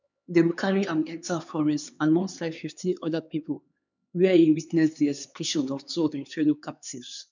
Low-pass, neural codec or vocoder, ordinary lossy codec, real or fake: 7.2 kHz; codec, 16 kHz, 4 kbps, X-Codec, HuBERT features, trained on LibriSpeech; none; fake